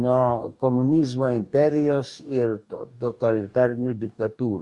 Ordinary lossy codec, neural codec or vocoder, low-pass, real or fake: Opus, 64 kbps; codec, 44.1 kHz, 2.6 kbps, DAC; 10.8 kHz; fake